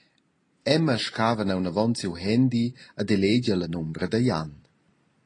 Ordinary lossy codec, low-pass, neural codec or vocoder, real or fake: AAC, 32 kbps; 9.9 kHz; none; real